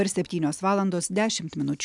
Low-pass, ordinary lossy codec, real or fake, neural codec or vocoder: 10.8 kHz; MP3, 96 kbps; real; none